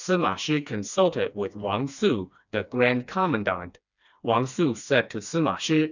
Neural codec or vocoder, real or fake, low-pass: codec, 16 kHz, 2 kbps, FreqCodec, smaller model; fake; 7.2 kHz